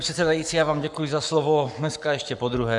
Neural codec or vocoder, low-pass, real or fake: codec, 44.1 kHz, 7.8 kbps, Pupu-Codec; 10.8 kHz; fake